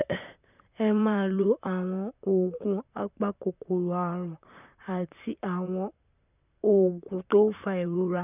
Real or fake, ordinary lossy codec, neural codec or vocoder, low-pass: fake; none; vocoder, 44.1 kHz, 128 mel bands, Pupu-Vocoder; 3.6 kHz